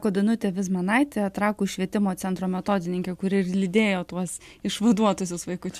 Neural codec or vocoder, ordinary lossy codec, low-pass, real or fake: none; MP3, 96 kbps; 14.4 kHz; real